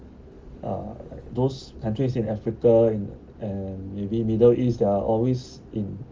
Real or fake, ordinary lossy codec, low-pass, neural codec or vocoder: real; Opus, 32 kbps; 7.2 kHz; none